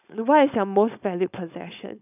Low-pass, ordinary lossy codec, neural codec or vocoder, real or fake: 3.6 kHz; none; codec, 16 kHz, 4.8 kbps, FACodec; fake